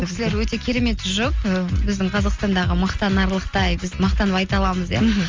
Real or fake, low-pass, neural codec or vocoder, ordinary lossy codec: real; 7.2 kHz; none; Opus, 32 kbps